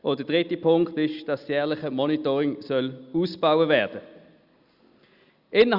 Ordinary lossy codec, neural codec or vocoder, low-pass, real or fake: Opus, 64 kbps; none; 5.4 kHz; real